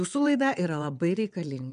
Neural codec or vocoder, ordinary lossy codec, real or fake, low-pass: vocoder, 22.05 kHz, 80 mel bands, WaveNeXt; MP3, 96 kbps; fake; 9.9 kHz